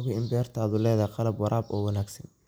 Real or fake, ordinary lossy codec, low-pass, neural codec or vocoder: real; none; none; none